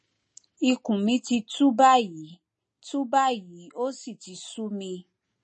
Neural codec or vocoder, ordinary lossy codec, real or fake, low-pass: none; MP3, 32 kbps; real; 10.8 kHz